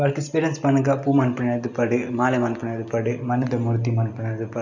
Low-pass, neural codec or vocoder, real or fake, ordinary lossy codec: 7.2 kHz; none; real; none